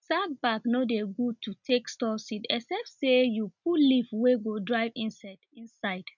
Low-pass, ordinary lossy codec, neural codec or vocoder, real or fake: 7.2 kHz; none; none; real